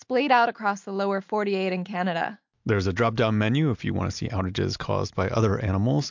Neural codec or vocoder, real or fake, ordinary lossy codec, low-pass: none; real; MP3, 64 kbps; 7.2 kHz